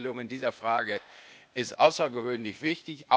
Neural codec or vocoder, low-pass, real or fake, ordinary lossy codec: codec, 16 kHz, 0.8 kbps, ZipCodec; none; fake; none